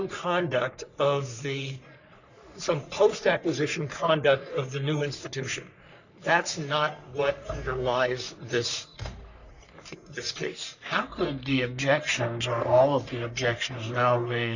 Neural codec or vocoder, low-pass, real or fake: codec, 44.1 kHz, 3.4 kbps, Pupu-Codec; 7.2 kHz; fake